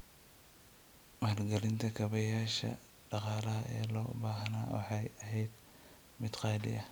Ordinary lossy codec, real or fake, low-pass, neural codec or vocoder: none; real; none; none